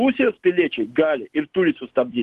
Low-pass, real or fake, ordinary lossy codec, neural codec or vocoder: 10.8 kHz; real; Opus, 16 kbps; none